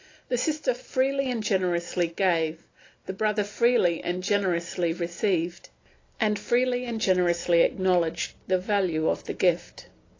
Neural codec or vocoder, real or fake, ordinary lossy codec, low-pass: none; real; AAC, 32 kbps; 7.2 kHz